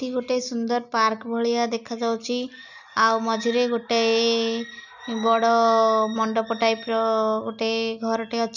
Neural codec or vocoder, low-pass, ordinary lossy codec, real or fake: none; 7.2 kHz; none; real